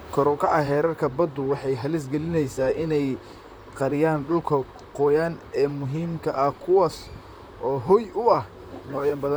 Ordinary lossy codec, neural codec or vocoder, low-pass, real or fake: none; vocoder, 44.1 kHz, 128 mel bands, Pupu-Vocoder; none; fake